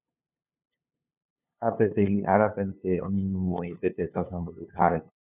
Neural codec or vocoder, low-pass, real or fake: codec, 16 kHz, 2 kbps, FunCodec, trained on LibriTTS, 25 frames a second; 3.6 kHz; fake